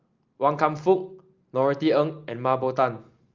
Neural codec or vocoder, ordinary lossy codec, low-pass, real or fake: none; Opus, 32 kbps; 7.2 kHz; real